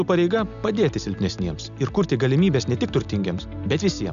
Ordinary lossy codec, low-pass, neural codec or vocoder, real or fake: AAC, 96 kbps; 7.2 kHz; none; real